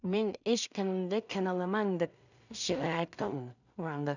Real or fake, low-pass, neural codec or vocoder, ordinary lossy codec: fake; 7.2 kHz; codec, 16 kHz in and 24 kHz out, 0.4 kbps, LongCat-Audio-Codec, two codebook decoder; none